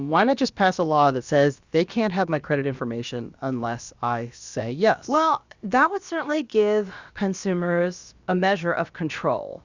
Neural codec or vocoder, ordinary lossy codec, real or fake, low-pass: codec, 16 kHz, about 1 kbps, DyCAST, with the encoder's durations; Opus, 64 kbps; fake; 7.2 kHz